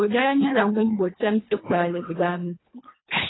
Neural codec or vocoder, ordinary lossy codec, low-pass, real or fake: codec, 24 kHz, 1.5 kbps, HILCodec; AAC, 16 kbps; 7.2 kHz; fake